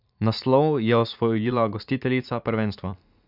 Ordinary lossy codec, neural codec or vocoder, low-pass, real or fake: none; vocoder, 44.1 kHz, 80 mel bands, Vocos; 5.4 kHz; fake